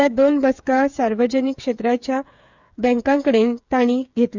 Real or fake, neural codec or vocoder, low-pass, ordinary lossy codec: fake; codec, 16 kHz, 8 kbps, FreqCodec, smaller model; 7.2 kHz; none